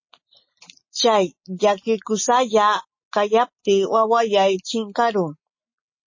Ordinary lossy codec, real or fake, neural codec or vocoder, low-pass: MP3, 32 kbps; real; none; 7.2 kHz